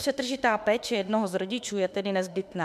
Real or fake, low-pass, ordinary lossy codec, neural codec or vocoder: fake; 14.4 kHz; MP3, 96 kbps; autoencoder, 48 kHz, 32 numbers a frame, DAC-VAE, trained on Japanese speech